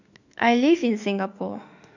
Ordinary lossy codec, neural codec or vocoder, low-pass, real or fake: none; autoencoder, 48 kHz, 32 numbers a frame, DAC-VAE, trained on Japanese speech; 7.2 kHz; fake